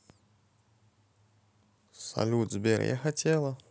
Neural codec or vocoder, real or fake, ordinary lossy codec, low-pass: none; real; none; none